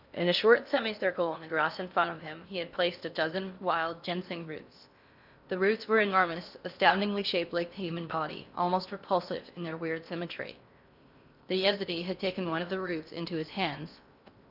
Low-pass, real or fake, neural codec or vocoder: 5.4 kHz; fake; codec, 16 kHz in and 24 kHz out, 0.6 kbps, FocalCodec, streaming, 2048 codes